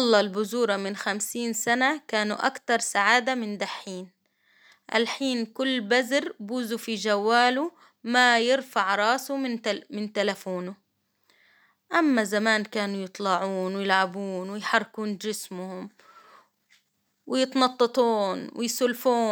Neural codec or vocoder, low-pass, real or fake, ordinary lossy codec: none; none; real; none